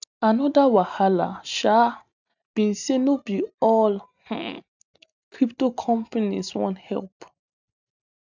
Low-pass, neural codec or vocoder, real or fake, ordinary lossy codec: 7.2 kHz; none; real; none